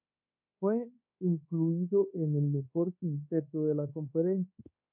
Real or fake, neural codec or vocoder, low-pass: fake; codec, 24 kHz, 1.2 kbps, DualCodec; 3.6 kHz